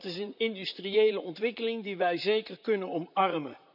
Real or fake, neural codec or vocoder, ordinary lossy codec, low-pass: fake; vocoder, 44.1 kHz, 128 mel bands, Pupu-Vocoder; none; 5.4 kHz